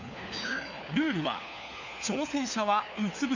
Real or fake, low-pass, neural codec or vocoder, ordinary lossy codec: fake; 7.2 kHz; codec, 16 kHz, 4 kbps, FunCodec, trained on LibriTTS, 50 frames a second; none